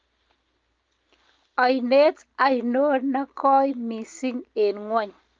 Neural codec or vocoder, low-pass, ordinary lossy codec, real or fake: none; 7.2 kHz; Opus, 24 kbps; real